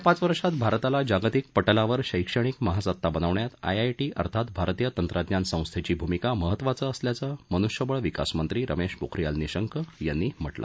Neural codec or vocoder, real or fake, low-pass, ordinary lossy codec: none; real; none; none